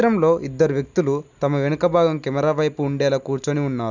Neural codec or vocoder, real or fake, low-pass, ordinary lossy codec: none; real; 7.2 kHz; none